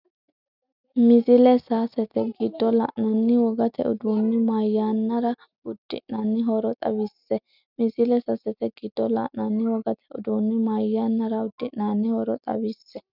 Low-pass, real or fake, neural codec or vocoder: 5.4 kHz; real; none